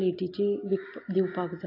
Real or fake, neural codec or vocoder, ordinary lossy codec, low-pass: real; none; AAC, 24 kbps; 5.4 kHz